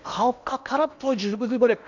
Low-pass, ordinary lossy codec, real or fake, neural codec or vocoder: 7.2 kHz; none; fake; codec, 16 kHz in and 24 kHz out, 0.8 kbps, FocalCodec, streaming, 65536 codes